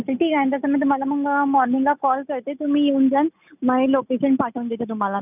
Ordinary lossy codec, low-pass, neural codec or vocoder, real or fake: none; 3.6 kHz; none; real